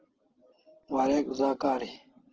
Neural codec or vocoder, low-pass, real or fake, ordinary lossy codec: none; 7.2 kHz; real; Opus, 16 kbps